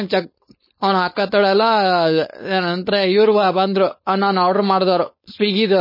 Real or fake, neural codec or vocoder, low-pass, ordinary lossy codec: fake; codec, 16 kHz, 4.8 kbps, FACodec; 5.4 kHz; MP3, 24 kbps